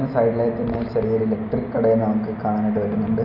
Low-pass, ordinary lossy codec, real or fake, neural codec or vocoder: 5.4 kHz; none; real; none